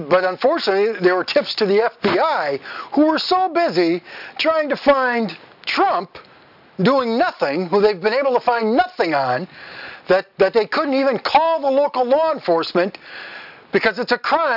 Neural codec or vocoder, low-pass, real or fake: none; 5.4 kHz; real